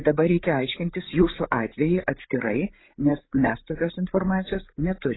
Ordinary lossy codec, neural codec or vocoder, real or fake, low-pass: AAC, 16 kbps; codec, 16 kHz, 8 kbps, FreqCodec, larger model; fake; 7.2 kHz